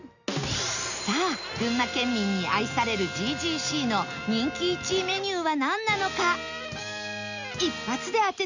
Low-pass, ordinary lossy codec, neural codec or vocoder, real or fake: 7.2 kHz; none; none; real